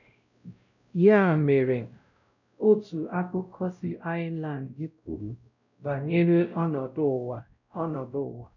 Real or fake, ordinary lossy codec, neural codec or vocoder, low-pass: fake; none; codec, 16 kHz, 0.5 kbps, X-Codec, WavLM features, trained on Multilingual LibriSpeech; 7.2 kHz